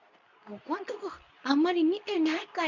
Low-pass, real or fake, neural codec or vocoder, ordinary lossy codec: 7.2 kHz; fake; codec, 24 kHz, 0.9 kbps, WavTokenizer, medium speech release version 2; none